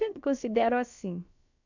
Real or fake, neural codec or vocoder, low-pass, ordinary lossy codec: fake; codec, 16 kHz, about 1 kbps, DyCAST, with the encoder's durations; 7.2 kHz; none